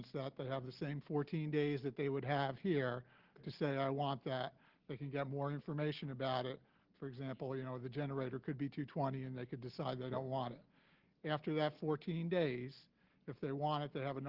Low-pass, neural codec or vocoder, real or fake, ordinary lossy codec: 5.4 kHz; none; real; Opus, 16 kbps